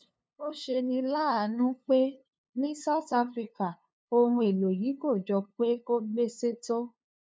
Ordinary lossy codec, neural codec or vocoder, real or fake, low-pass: none; codec, 16 kHz, 2 kbps, FunCodec, trained on LibriTTS, 25 frames a second; fake; none